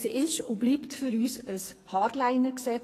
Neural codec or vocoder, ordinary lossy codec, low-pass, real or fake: codec, 44.1 kHz, 2.6 kbps, SNAC; AAC, 48 kbps; 14.4 kHz; fake